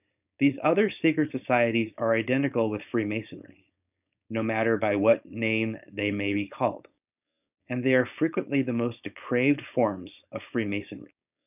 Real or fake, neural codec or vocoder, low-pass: fake; codec, 16 kHz, 4.8 kbps, FACodec; 3.6 kHz